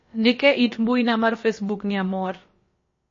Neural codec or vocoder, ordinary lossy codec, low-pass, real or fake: codec, 16 kHz, about 1 kbps, DyCAST, with the encoder's durations; MP3, 32 kbps; 7.2 kHz; fake